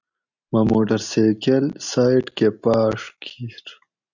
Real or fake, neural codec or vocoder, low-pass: real; none; 7.2 kHz